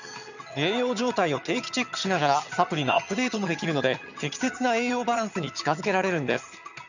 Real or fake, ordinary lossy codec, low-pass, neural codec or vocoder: fake; none; 7.2 kHz; vocoder, 22.05 kHz, 80 mel bands, HiFi-GAN